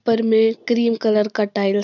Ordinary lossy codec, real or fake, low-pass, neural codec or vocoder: none; real; 7.2 kHz; none